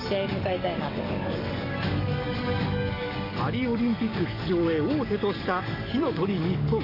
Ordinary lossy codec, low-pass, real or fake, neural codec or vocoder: none; 5.4 kHz; fake; codec, 44.1 kHz, 7.8 kbps, DAC